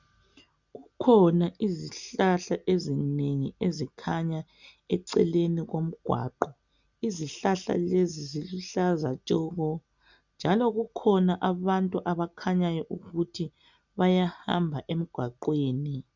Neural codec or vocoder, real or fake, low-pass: none; real; 7.2 kHz